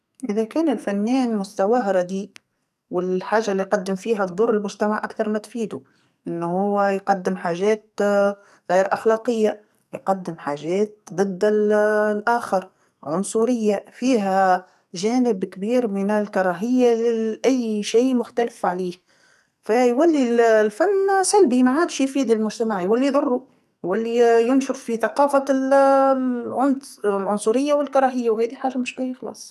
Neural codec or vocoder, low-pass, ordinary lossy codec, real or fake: codec, 44.1 kHz, 2.6 kbps, SNAC; 14.4 kHz; none; fake